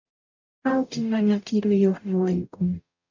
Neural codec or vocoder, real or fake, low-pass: codec, 44.1 kHz, 0.9 kbps, DAC; fake; 7.2 kHz